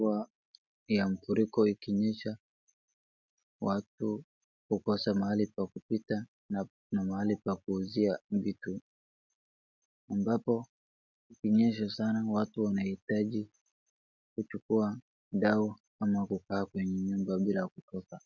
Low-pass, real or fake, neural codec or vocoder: 7.2 kHz; real; none